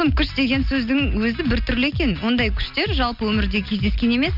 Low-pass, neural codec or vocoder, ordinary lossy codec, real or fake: 5.4 kHz; none; none; real